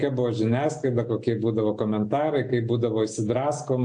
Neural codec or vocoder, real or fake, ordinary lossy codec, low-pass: none; real; AAC, 64 kbps; 9.9 kHz